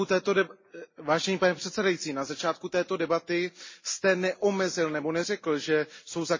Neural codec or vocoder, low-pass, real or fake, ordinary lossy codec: none; 7.2 kHz; real; MP3, 32 kbps